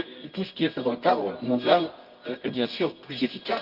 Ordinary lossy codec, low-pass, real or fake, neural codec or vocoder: Opus, 32 kbps; 5.4 kHz; fake; codec, 24 kHz, 0.9 kbps, WavTokenizer, medium music audio release